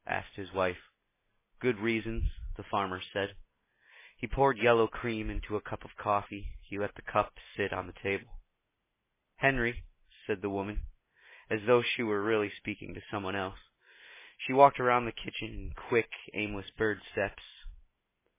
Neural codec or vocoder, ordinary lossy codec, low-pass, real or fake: none; MP3, 16 kbps; 3.6 kHz; real